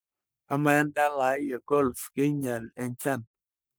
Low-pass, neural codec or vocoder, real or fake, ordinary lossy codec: none; codec, 44.1 kHz, 3.4 kbps, Pupu-Codec; fake; none